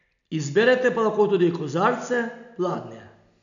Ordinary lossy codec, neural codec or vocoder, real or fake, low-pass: none; none; real; 7.2 kHz